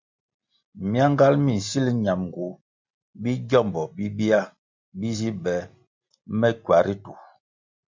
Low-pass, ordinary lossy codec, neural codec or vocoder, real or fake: 7.2 kHz; MP3, 64 kbps; none; real